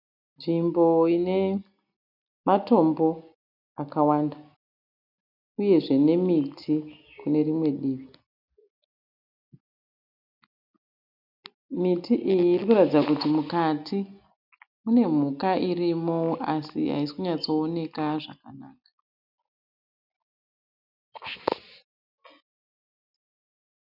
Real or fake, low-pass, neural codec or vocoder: real; 5.4 kHz; none